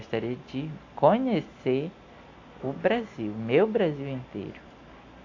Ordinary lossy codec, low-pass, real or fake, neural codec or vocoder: AAC, 48 kbps; 7.2 kHz; real; none